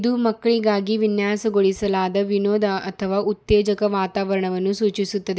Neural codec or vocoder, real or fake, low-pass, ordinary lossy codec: none; real; none; none